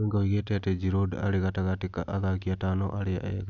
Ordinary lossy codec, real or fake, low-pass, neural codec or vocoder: none; real; 7.2 kHz; none